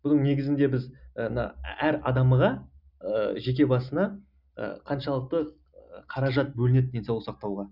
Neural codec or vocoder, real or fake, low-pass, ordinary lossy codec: none; real; 5.4 kHz; none